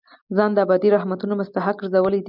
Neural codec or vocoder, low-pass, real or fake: none; 5.4 kHz; real